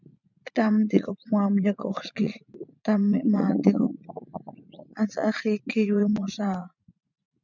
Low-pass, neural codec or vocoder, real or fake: 7.2 kHz; vocoder, 44.1 kHz, 80 mel bands, Vocos; fake